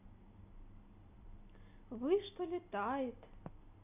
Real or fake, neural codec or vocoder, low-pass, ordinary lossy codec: real; none; 3.6 kHz; none